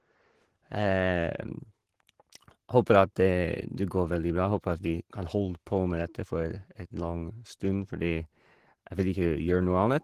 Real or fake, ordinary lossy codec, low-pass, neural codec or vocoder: fake; Opus, 16 kbps; 14.4 kHz; codec, 44.1 kHz, 7.8 kbps, Pupu-Codec